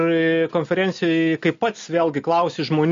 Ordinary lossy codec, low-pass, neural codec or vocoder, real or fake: MP3, 48 kbps; 7.2 kHz; none; real